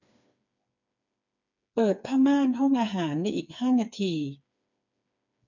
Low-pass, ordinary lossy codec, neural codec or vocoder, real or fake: 7.2 kHz; none; codec, 16 kHz, 4 kbps, FreqCodec, smaller model; fake